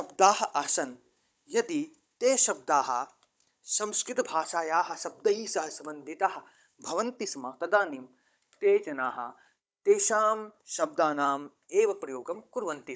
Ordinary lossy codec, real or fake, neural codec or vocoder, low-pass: none; fake; codec, 16 kHz, 16 kbps, FunCodec, trained on Chinese and English, 50 frames a second; none